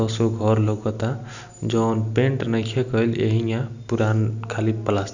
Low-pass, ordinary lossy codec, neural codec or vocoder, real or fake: 7.2 kHz; none; none; real